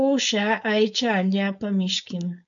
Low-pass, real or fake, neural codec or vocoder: 7.2 kHz; fake; codec, 16 kHz, 4.8 kbps, FACodec